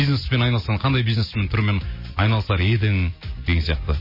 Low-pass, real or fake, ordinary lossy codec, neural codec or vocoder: 5.4 kHz; real; MP3, 24 kbps; none